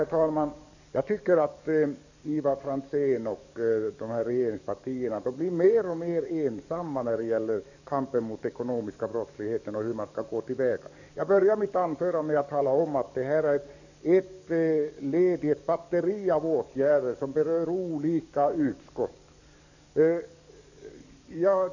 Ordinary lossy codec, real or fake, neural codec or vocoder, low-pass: none; fake; codec, 44.1 kHz, 7.8 kbps, DAC; 7.2 kHz